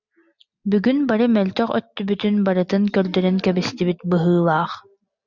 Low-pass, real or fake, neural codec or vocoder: 7.2 kHz; real; none